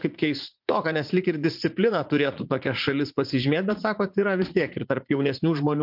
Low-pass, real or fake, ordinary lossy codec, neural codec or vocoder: 5.4 kHz; fake; MP3, 48 kbps; vocoder, 44.1 kHz, 80 mel bands, Vocos